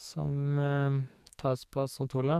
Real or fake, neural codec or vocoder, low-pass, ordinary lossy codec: fake; codec, 44.1 kHz, 2.6 kbps, SNAC; 14.4 kHz; MP3, 96 kbps